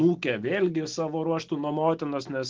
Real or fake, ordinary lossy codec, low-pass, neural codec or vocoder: real; Opus, 16 kbps; 7.2 kHz; none